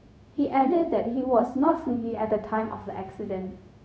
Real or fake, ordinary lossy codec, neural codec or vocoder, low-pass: fake; none; codec, 16 kHz, 0.4 kbps, LongCat-Audio-Codec; none